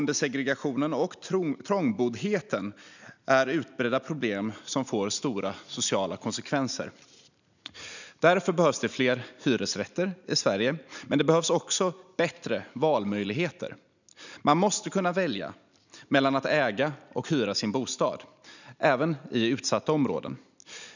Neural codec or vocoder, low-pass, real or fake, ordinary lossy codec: none; 7.2 kHz; real; none